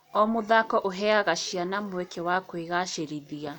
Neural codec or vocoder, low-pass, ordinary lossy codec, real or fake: none; 19.8 kHz; none; real